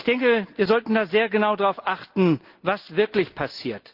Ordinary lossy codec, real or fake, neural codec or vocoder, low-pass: Opus, 32 kbps; real; none; 5.4 kHz